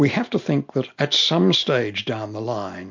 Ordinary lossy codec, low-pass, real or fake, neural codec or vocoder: MP3, 48 kbps; 7.2 kHz; real; none